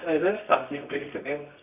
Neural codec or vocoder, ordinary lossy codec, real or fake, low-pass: codec, 24 kHz, 0.9 kbps, WavTokenizer, medium music audio release; none; fake; 3.6 kHz